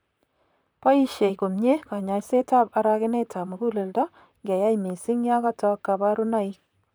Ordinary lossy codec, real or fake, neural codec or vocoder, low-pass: none; fake; vocoder, 44.1 kHz, 128 mel bands, Pupu-Vocoder; none